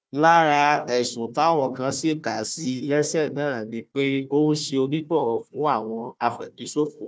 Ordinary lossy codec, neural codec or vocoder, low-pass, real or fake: none; codec, 16 kHz, 1 kbps, FunCodec, trained on Chinese and English, 50 frames a second; none; fake